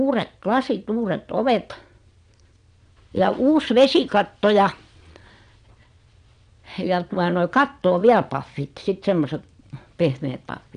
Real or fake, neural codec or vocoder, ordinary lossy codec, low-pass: fake; vocoder, 22.05 kHz, 80 mel bands, Vocos; none; 9.9 kHz